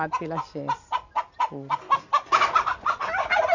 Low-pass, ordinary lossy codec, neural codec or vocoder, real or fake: 7.2 kHz; none; none; real